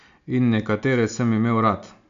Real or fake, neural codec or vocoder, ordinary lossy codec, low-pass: real; none; AAC, 64 kbps; 7.2 kHz